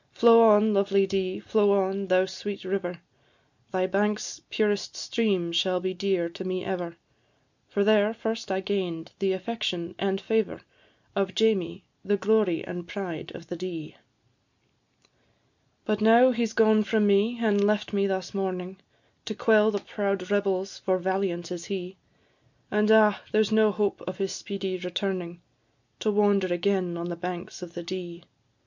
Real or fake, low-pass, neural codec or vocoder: real; 7.2 kHz; none